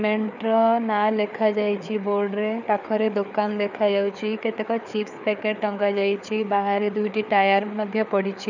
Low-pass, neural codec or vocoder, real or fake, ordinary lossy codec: 7.2 kHz; codec, 16 kHz, 4 kbps, FreqCodec, larger model; fake; none